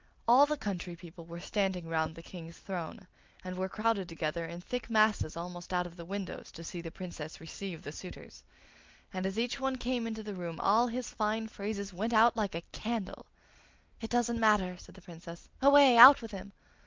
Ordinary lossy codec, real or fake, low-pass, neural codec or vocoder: Opus, 32 kbps; real; 7.2 kHz; none